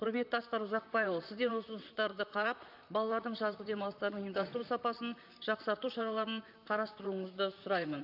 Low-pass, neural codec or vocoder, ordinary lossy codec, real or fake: 5.4 kHz; vocoder, 44.1 kHz, 128 mel bands, Pupu-Vocoder; none; fake